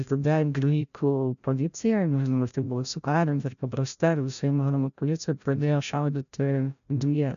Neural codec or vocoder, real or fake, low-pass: codec, 16 kHz, 0.5 kbps, FreqCodec, larger model; fake; 7.2 kHz